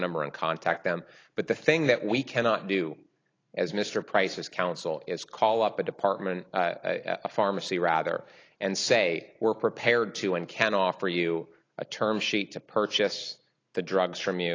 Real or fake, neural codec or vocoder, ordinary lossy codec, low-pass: real; none; AAC, 32 kbps; 7.2 kHz